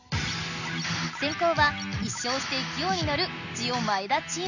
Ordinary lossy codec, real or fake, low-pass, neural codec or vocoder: none; real; 7.2 kHz; none